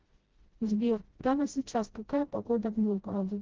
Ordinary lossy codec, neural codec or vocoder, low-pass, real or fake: Opus, 16 kbps; codec, 16 kHz, 0.5 kbps, FreqCodec, smaller model; 7.2 kHz; fake